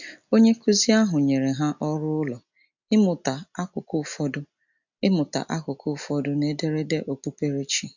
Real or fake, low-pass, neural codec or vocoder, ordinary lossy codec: real; 7.2 kHz; none; none